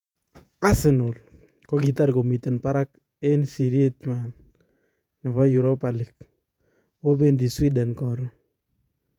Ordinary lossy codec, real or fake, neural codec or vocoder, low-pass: none; fake; vocoder, 48 kHz, 128 mel bands, Vocos; 19.8 kHz